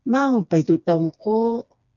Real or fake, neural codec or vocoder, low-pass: fake; codec, 16 kHz, 2 kbps, FreqCodec, smaller model; 7.2 kHz